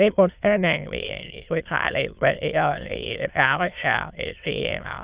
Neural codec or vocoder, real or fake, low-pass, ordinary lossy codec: autoencoder, 22.05 kHz, a latent of 192 numbers a frame, VITS, trained on many speakers; fake; 3.6 kHz; Opus, 64 kbps